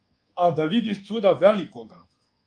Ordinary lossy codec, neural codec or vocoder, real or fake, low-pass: Opus, 32 kbps; codec, 24 kHz, 1.2 kbps, DualCodec; fake; 9.9 kHz